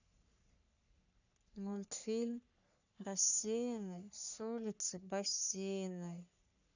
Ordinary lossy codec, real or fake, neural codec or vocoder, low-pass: none; fake; codec, 44.1 kHz, 3.4 kbps, Pupu-Codec; 7.2 kHz